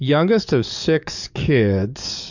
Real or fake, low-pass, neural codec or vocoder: fake; 7.2 kHz; codec, 44.1 kHz, 7.8 kbps, DAC